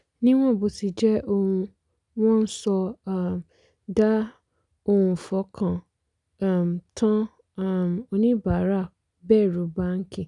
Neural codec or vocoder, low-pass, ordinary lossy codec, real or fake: none; 10.8 kHz; none; real